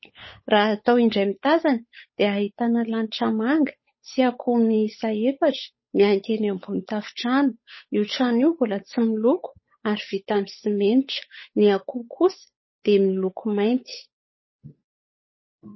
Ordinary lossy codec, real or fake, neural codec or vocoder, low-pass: MP3, 24 kbps; fake; codec, 16 kHz, 8 kbps, FunCodec, trained on Chinese and English, 25 frames a second; 7.2 kHz